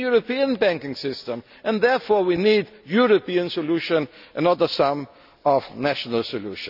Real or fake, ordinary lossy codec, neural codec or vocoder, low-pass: real; none; none; 5.4 kHz